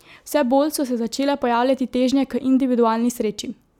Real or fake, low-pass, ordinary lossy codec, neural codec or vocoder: real; 19.8 kHz; none; none